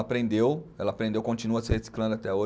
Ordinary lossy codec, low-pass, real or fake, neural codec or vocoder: none; none; real; none